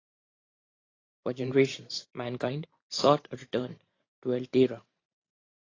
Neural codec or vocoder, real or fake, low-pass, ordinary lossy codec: vocoder, 44.1 kHz, 128 mel bands every 512 samples, BigVGAN v2; fake; 7.2 kHz; AAC, 32 kbps